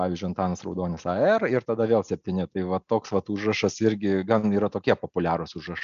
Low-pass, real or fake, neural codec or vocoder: 7.2 kHz; real; none